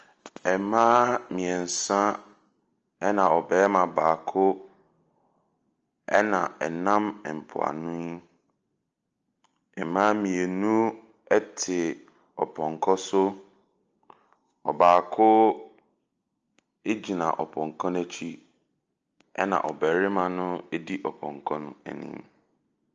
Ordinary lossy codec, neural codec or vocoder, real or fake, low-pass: Opus, 24 kbps; none; real; 7.2 kHz